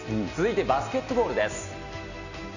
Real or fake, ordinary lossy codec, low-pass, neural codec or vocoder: real; none; 7.2 kHz; none